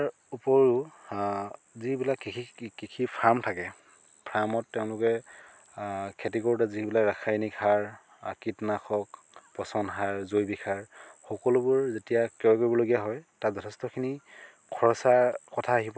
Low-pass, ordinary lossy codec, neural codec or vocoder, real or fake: none; none; none; real